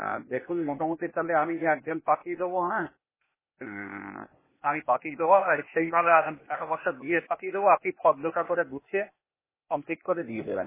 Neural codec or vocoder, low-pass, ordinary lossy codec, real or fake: codec, 16 kHz, 0.8 kbps, ZipCodec; 3.6 kHz; MP3, 16 kbps; fake